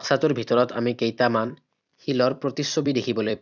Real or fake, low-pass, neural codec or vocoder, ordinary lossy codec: fake; 7.2 kHz; vocoder, 44.1 kHz, 128 mel bands every 256 samples, BigVGAN v2; none